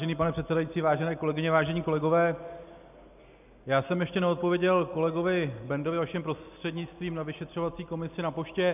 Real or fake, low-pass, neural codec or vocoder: real; 3.6 kHz; none